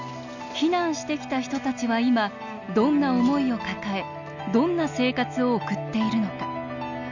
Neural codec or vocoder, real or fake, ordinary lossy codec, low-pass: none; real; none; 7.2 kHz